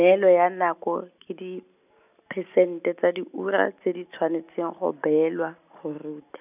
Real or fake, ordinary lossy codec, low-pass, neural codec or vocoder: real; none; 3.6 kHz; none